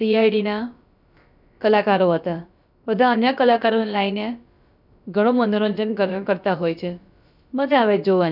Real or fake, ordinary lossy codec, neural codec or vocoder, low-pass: fake; none; codec, 16 kHz, about 1 kbps, DyCAST, with the encoder's durations; 5.4 kHz